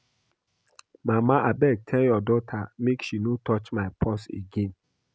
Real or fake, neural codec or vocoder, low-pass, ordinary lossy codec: real; none; none; none